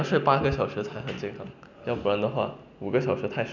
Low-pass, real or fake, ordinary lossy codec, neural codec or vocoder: 7.2 kHz; real; none; none